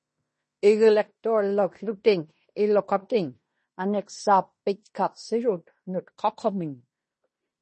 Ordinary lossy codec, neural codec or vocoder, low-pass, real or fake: MP3, 32 kbps; codec, 16 kHz in and 24 kHz out, 0.9 kbps, LongCat-Audio-Codec, fine tuned four codebook decoder; 10.8 kHz; fake